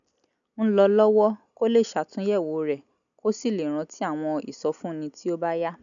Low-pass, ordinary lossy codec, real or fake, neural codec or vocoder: 7.2 kHz; none; real; none